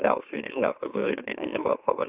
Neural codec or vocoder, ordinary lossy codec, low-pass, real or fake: autoencoder, 44.1 kHz, a latent of 192 numbers a frame, MeloTTS; Opus, 64 kbps; 3.6 kHz; fake